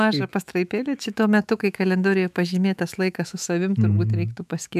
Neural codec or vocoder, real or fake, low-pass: autoencoder, 48 kHz, 128 numbers a frame, DAC-VAE, trained on Japanese speech; fake; 14.4 kHz